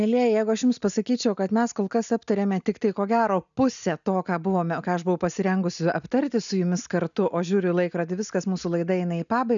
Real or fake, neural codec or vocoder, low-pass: real; none; 7.2 kHz